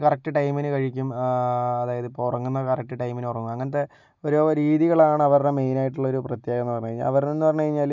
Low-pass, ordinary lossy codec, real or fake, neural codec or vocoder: 7.2 kHz; none; real; none